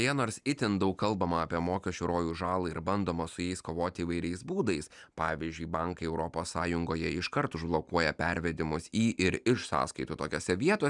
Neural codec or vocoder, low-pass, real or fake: none; 10.8 kHz; real